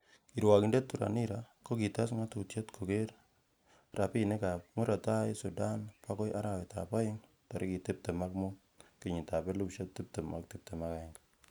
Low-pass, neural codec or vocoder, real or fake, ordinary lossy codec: none; none; real; none